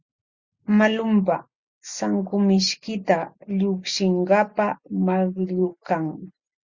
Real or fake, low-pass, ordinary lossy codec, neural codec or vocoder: real; 7.2 kHz; Opus, 64 kbps; none